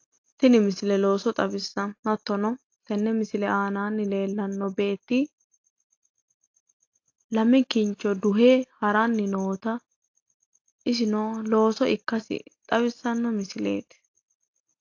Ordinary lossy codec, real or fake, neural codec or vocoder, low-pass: AAC, 48 kbps; real; none; 7.2 kHz